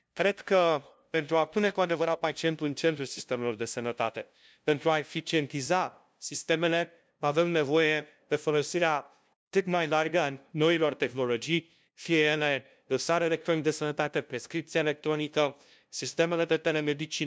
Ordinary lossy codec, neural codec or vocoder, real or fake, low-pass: none; codec, 16 kHz, 0.5 kbps, FunCodec, trained on LibriTTS, 25 frames a second; fake; none